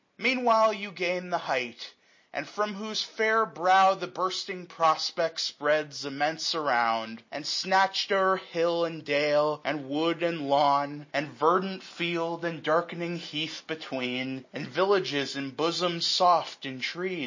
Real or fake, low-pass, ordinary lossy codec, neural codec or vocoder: real; 7.2 kHz; MP3, 32 kbps; none